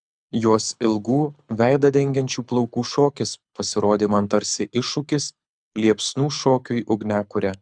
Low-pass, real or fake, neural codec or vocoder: 9.9 kHz; fake; codec, 24 kHz, 6 kbps, HILCodec